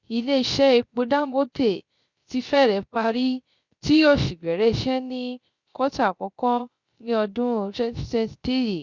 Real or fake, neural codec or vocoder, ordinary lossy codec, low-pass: fake; codec, 16 kHz, 0.3 kbps, FocalCodec; Opus, 64 kbps; 7.2 kHz